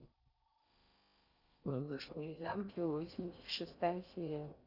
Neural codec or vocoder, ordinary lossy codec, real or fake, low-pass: codec, 16 kHz in and 24 kHz out, 0.6 kbps, FocalCodec, streaming, 4096 codes; Opus, 64 kbps; fake; 5.4 kHz